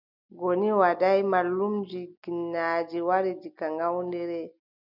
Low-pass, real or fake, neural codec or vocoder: 5.4 kHz; real; none